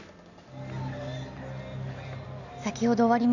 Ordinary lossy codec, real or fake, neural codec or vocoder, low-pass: none; real; none; 7.2 kHz